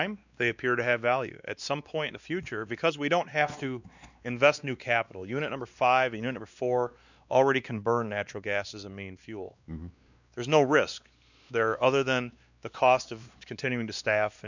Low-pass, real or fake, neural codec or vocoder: 7.2 kHz; fake; codec, 16 kHz, 2 kbps, X-Codec, WavLM features, trained on Multilingual LibriSpeech